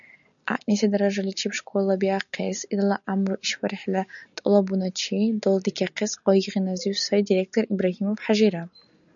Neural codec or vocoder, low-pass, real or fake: none; 7.2 kHz; real